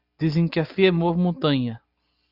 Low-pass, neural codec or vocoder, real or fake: 5.4 kHz; none; real